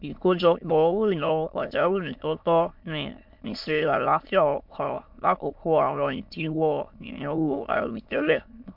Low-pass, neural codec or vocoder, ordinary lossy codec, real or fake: 5.4 kHz; autoencoder, 22.05 kHz, a latent of 192 numbers a frame, VITS, trained on many speakers; MP3, 48 kbps; fake